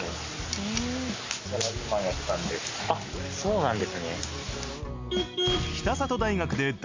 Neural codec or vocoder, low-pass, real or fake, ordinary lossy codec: none; 7.2 kHz; real; MP3, 64 kbps